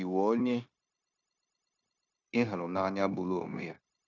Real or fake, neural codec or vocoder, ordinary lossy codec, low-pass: fake; codec, 16 kHz, 0.9 kbps, LongCat-Audio-Codec; none; 7.2 kHz